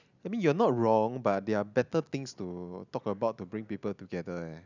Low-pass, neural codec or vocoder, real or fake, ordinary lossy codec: 7.2 kHz; none; real; none